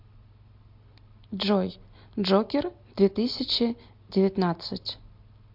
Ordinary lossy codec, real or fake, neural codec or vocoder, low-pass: MP3, 48 kbps; real; none; 5.4 kHz